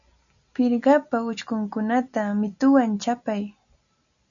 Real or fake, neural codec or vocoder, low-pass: real; none; 7.2 kHz